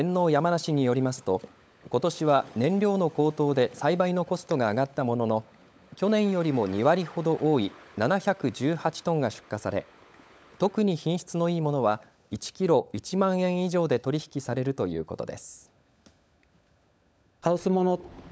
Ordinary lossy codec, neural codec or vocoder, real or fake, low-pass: none; codec, 16 kHz, 16 kbps, FunCodec, trained on LibriTTS, 50 frames a second; fake; none